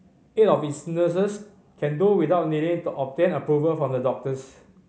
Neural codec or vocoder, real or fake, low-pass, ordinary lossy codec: none; real; none; none